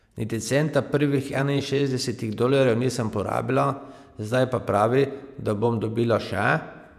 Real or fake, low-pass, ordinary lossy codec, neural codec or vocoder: fake; 14.4 kHz; none; vocoder, 48 kHz, 128 mel bands, Vocos